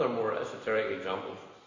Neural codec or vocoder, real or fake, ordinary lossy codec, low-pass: none; real; AAC, 48 kbps; 7.2 kHz